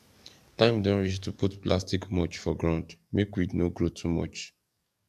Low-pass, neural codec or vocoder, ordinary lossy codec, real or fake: 14.4 kHz; codec, 44.1 kHz, 7.8 kbps, DAC; none; fake